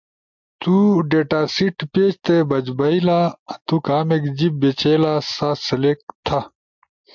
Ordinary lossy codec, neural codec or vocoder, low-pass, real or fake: MP3, 64 kbps; none; 7.2 kHz; real